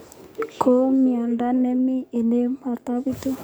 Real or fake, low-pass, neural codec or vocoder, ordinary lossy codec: fake; none; codec, 44.1 kHz, 7.8 kbps, DAC; none